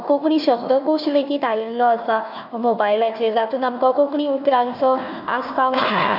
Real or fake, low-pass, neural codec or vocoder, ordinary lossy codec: fake; 5.4 kHz; codec, 16 kHz, 1 kbps, FunCodec, trained on Chinese and English, 50 frames a second; none